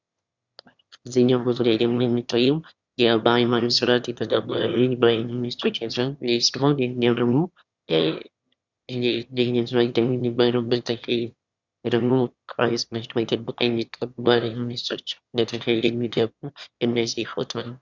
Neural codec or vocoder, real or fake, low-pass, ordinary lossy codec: autoencoder, 22.05 kHz, a latent of 192 numbers a frame, VITS, trained on one speaker; fake; 7.2 kHz; Opus, 64 kbps